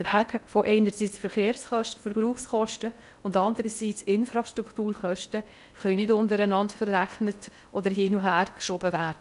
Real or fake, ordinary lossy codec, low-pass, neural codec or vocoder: fake; none; 10.8 kHz; codec, 16 kHz in and 24 kHz out, 0.6 kbps, FocalCodec, streaming, 4096 codes